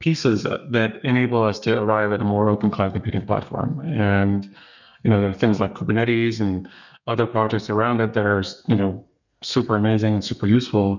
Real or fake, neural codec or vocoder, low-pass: fake; codec, 32 kHz, 1.9 kbps, SNAC; 7.2 kHz